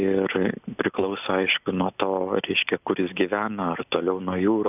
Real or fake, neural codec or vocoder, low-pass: real; none; 3.6 kHz